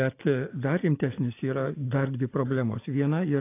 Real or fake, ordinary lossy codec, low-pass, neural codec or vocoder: real; AAC, 24 kbps; 3.6 kHz; none